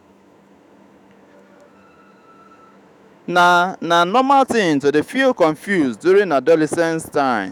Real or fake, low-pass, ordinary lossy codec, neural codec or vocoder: fake; 19.8 kHz; none; autoencoder, 48 kHz, 128 numbers a frame, DAC-VAE, trained on Japanese speech